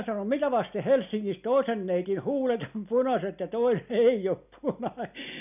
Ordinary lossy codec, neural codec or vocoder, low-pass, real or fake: none; none; 3.6 kHz; real